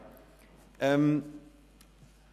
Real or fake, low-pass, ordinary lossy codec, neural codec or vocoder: real; 14.4 kHz; none; none